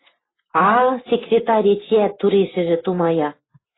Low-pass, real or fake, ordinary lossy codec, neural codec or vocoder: 7.2 kHz; real; AAC, 16 kbps; none